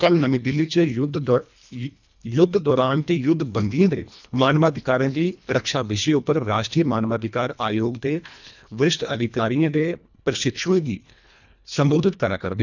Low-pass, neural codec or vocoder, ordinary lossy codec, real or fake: 7.2 kHz; codec, 24 kHz, 1.5 kbps, HILCodec; none; fake